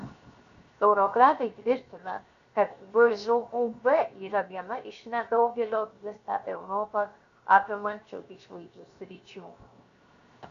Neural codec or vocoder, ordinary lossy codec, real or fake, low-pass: codec, 16 kHz, 0.7 kbps, FocalCodec; Opus, 64 kbps; fake; 7.2 kHz